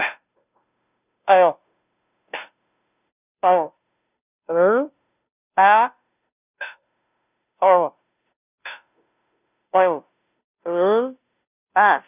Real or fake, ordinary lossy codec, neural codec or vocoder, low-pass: fake; none; codec, 16 kHz, 0.5 kbps, FunCodec, trained on Chinese and English, 25 frames a second; 3.6 kHz